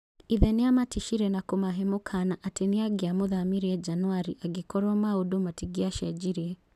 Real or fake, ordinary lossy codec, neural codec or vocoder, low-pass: real; none; none; 14.4 kHz